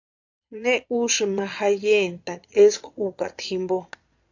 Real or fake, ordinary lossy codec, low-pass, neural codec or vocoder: fake; AAC, 48 kbps; 7.2 kHz; vocoder, 22.05 kHz, 80 mel bands, Vocos